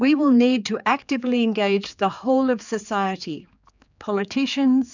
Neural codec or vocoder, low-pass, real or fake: codec, 16 kHz, 4 kbps, X-Codec, HuBERT features, trained on general audio; 7.2 kHz; fake